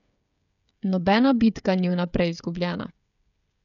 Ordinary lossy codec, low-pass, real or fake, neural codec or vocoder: none; 7.2 kHz; fake; codec, 16 kHz, 16 kbps, FreqCodec, smaller model